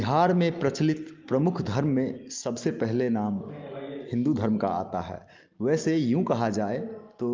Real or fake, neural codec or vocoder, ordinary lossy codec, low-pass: real; none; Opus, 24 kbps; 7.2 kHz